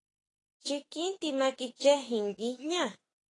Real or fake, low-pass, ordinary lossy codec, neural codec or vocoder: fake; 10.8 kHz; AAC, 32 kbps; autoencoder, 48 kHz, 32 numbers a frame, DAC-VAE, trained on Japanese speech